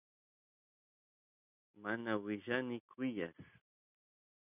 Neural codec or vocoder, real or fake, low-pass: none; real; 3.6 kHz